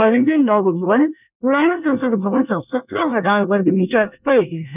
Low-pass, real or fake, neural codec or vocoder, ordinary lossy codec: 3.6 kHz; fake; codec, 24 kHz, 1 kbps, SNAC; none